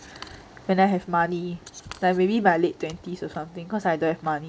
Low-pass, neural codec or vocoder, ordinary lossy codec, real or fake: none; none; none; real